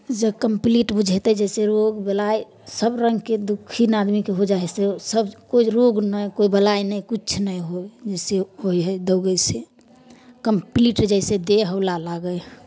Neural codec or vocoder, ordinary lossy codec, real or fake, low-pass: none; none; real; none